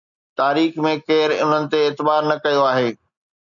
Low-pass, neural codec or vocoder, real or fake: 7.2 kHz; none; real